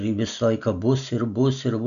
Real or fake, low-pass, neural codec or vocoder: real; 7.2 kHz; none